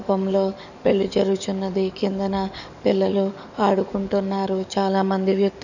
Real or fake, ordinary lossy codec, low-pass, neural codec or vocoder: fake; none; 7.2 kHz; codec, 44.1 kHz, 7.8 kbps, DAC